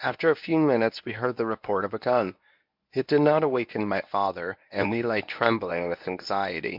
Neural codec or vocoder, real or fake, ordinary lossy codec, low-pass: codec, 24 kHz, 0.9 kbps, WavTokenizer, medium speech release version 1; fake; MP3, 48 kbps; 5.4 kHz